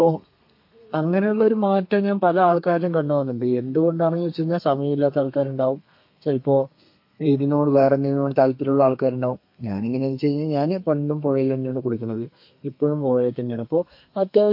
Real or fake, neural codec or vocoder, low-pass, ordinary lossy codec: fake; codec, 32 kHz, 1.9 kbps, SNAC; 5.4 kHz; MP3, 32 kbps